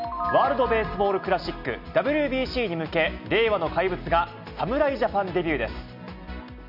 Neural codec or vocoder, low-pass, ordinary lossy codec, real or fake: none; 5.4 kHz; none; real